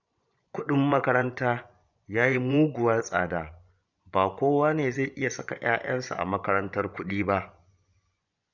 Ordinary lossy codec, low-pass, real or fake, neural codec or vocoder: none; 7.2 kHz; fake; vocoder, 24 kHz, 100 mel bands, Vocos